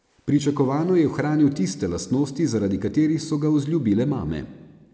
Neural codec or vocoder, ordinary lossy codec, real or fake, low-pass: none; none; real; none